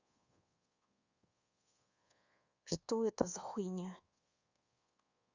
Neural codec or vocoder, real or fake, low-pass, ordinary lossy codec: codec, 24 kHz, 1.2 kbps, DualCodec; fake; 7.2 kHz; Opus, 64 kbps